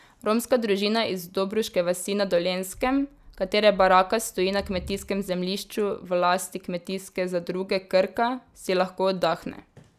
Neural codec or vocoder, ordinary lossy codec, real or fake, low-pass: none; none; real; 14.4 kHz